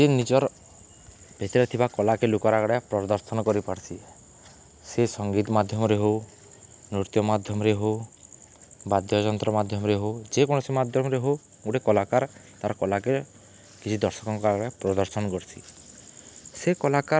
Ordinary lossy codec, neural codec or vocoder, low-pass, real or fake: none; none; none; real